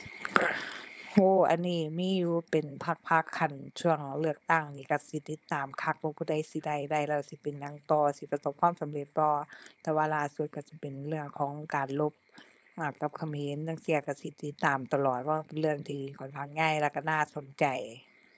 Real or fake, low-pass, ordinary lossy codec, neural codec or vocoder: fake; none; none; codec, 16 kHz, 4.8 kbps, FACodec